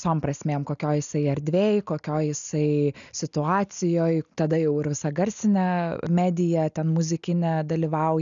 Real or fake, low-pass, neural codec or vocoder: real; 7.2 kHz; none